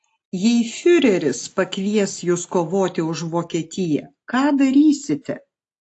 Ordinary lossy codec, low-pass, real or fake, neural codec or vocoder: AAC, 48 kbps; 9.9 kHz; real; none